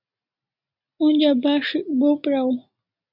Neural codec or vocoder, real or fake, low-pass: none; real; 5.4 kHz